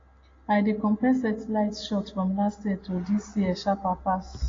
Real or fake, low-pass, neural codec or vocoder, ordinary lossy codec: real; 7.2 kHz; none; AAC, 32 kbps